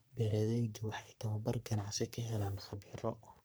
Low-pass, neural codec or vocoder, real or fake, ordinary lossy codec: none; codec, 44.1 kHz, 3.4 kbps, Pupu-Codec; fake; none